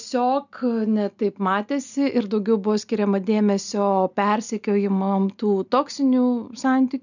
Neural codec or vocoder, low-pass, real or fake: none; 7.2 kHz; real